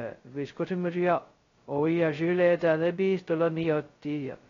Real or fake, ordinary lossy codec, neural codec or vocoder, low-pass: fake; AAC, 32 kbps; codec, 16 kHz, 0.2 kbps, FocalCodec; 7.2 kHz